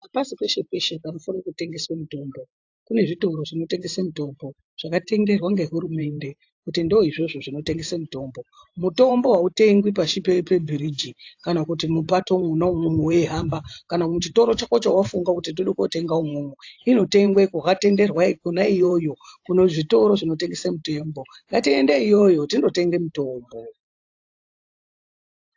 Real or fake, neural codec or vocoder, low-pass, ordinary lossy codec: fake; vocoder, 44.1 kHz, 128 mel bands every 512 samples, BigVGAN v2; 7.2 kHz; AAC, 48 kbps